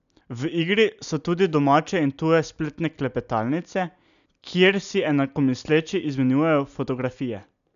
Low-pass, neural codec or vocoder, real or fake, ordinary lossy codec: 7.2 kHz; none; real; none